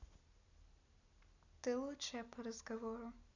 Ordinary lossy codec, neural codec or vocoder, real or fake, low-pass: none; none; real; 7.2 kHz